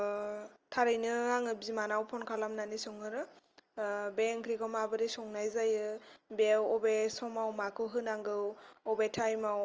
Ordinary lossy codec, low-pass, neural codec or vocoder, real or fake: Opus, 24 kbps; 7.2 kHz; none; real